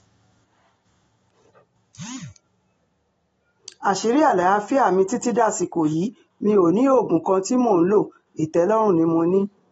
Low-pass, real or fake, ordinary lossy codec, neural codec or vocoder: 14.4 kHz; real; AAC, 24 kbps; none